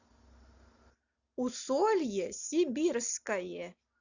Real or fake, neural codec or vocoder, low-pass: real; none; 7.2 kHz